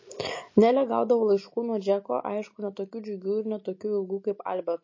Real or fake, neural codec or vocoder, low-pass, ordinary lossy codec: real; none; 7.2 kHz; MP3, 32 kbps